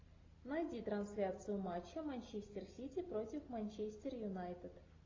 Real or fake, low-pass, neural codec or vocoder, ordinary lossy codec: real; 7.2 kHz; none; AAC, 32 kbps